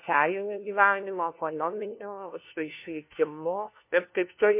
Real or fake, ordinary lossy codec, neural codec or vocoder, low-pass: fake; MP3, 24 kbps; codec, 16 kHz, 1 kbps, FunCodec, trained on LibriTTS, 50 frames a second; 3.6 kHz